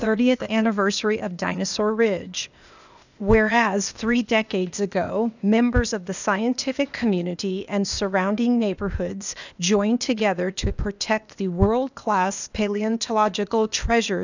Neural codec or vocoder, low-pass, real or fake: codec, 16 kHz, 0.8 kbps, ZipCodec; 7.2 kHz; fake